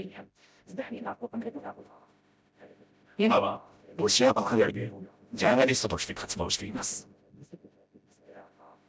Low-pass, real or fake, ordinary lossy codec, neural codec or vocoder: none; fake; none; codec, 16 kHz, 0.5 kbps, FreqCodec, smaller model